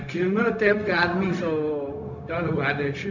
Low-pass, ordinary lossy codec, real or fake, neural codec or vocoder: 7.2 kHz; none; fake; codec, 16 kHz, 0.4 kbps, LongCat-Audio-Codec